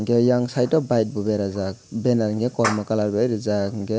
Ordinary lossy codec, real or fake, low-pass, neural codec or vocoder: none; real; none; none